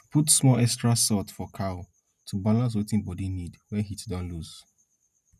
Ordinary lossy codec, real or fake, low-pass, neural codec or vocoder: none; real; 14.4 kHz; none